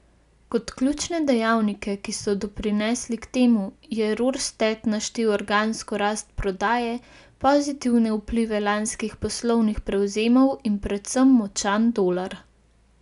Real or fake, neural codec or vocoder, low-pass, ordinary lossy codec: real; none; 10.8 kHz; none